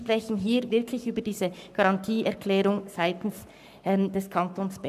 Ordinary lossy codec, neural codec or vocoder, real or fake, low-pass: none; codec, 44.1 kHz, 7.8 kbps, Pupu-Codec; fake; 14.4 kHz